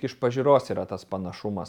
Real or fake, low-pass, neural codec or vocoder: real; 19.8 kHz; none